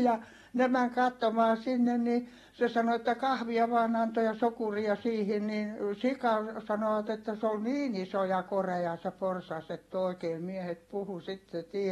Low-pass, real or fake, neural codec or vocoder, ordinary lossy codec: 19.8 kHz; real; none; AAC, 32 kbps